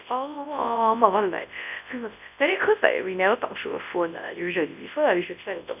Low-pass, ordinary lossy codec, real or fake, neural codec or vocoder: 3.6 kHz; none; fake; codec, 24 kHz, 0.9 kbps, WavTokenizer, large speech release